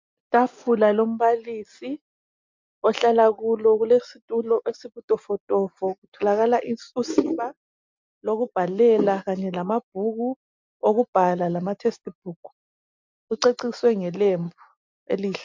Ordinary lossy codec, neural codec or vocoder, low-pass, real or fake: MP3, 64 kbps; none; 7.2 kHz; real